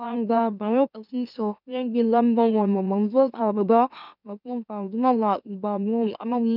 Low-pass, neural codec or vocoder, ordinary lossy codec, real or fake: 5.4 kHz; autoencoder, 44.1 kHz, a latent of 192 numbers a frame, MeloTTS; none; fake